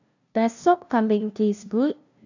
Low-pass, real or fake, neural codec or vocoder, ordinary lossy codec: 7.2 kHz; fake; codec, 16 kHz, 0.5 kbps, FunCodec, trained on LibriTTS, 25 frames a second; none